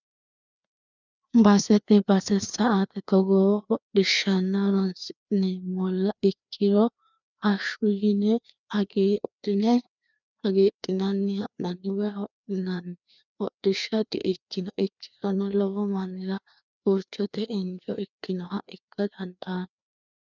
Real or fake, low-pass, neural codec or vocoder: fake; 7.2 kHz; codec, 16 kHz, 2 kbps, FreqCodec, larger model